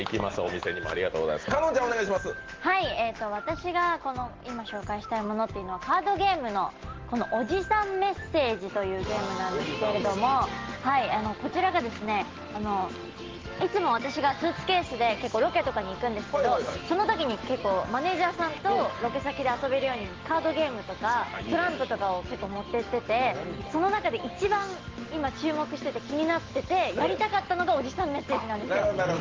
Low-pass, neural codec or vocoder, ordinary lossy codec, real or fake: 7.2 kHz; none; Opus, 16 kbps; real